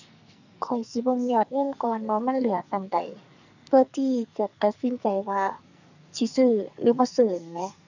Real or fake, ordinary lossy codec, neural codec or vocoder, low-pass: fake; none; codec, 44.1 kHz, 2.6 kbps, SNAC; 7.2 kHz